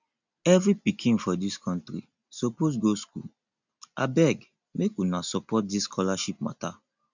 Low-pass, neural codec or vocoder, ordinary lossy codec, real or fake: 7.2 kHz; none; none; real